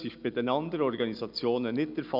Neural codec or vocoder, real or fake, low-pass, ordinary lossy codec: none; real; 5.4 kHz; none